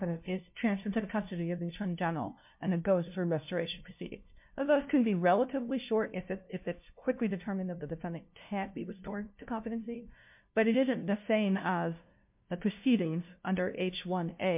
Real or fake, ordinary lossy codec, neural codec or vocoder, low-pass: fake; MP3, 32 kbps; codec, 16 kHz, 0.5 kbps, FunCodec, trained on LibriTTS, 25 frames a second; 3.6 kHz